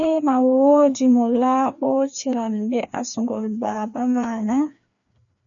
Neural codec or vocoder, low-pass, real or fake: codec, 16 kHz, 2 kbps, FreqCodec, larger model; 7.2 kHz; fake